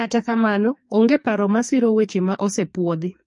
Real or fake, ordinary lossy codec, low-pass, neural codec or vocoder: fake; MP3, 48 kbps; 10.8 kHz; codec, 44.1 kHz, 2.6 kbps, SNAC